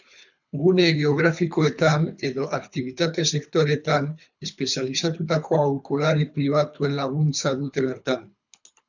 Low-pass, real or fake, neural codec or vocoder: 7.2 kHz; fake; codec, 24 kHz, 3 kbps, HILCodec